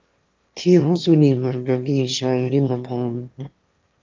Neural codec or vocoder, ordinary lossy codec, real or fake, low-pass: autoencoder, 22.05 kHz, a latent of 192 numbers a frame, VITS, trained on one speaker; Opus, 24 kbps; fake; 7.2 kHz